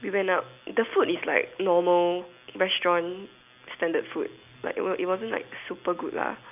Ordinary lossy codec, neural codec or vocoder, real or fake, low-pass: none; none; real; 3.6 kHz